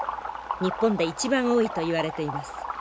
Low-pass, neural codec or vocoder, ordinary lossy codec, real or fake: none; none; none; real